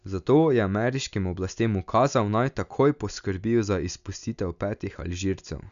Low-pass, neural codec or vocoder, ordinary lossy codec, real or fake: 7.2 kHz; none; none; real